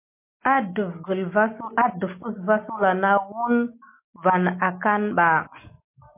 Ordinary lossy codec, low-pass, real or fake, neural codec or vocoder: MP3, 24 kbps; 3.6 kHz; real; none